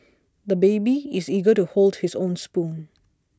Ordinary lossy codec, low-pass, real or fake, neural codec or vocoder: none; none; real; none